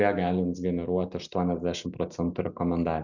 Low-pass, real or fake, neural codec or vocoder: 7.2 kHz; real; none